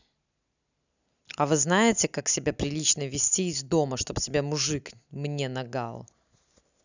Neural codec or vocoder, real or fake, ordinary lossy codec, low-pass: none; real; none; 7.2 kHz